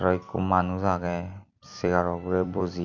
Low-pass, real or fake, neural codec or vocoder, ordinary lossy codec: 7.2 kHz; fake; vocoder, 44.1 kHz, 128 mel bands every 256 samples, BigVGAN v2; none